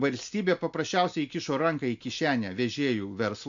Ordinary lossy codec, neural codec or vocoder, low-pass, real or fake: MP3, 64 kbps; none; 7.2 kHz; real